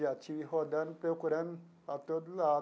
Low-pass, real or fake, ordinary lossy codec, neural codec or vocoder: none; real; none; none